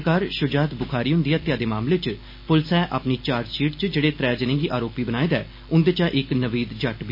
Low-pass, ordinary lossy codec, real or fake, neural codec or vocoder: 5.4 kHz; MP3, 24 kbps; real; none